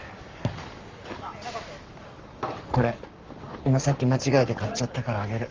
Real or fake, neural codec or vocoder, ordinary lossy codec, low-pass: fake; codec, 44.1 kHz, 7.8 kbps, Pupu-Codec; Opus, 32 kbps; 7.2 kHz